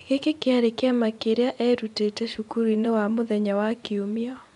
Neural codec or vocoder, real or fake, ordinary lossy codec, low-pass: vocoder, 24 kHz, 100 mel bands, Vocos; fake; none; 10.8 kHz